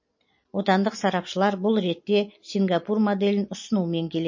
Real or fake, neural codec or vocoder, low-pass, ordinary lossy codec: real; none; 7.2 kHz; MP3, 32 kbps